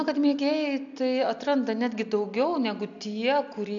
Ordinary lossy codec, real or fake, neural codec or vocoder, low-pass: AAC, 64 kbps; real; none; 7.2 kHz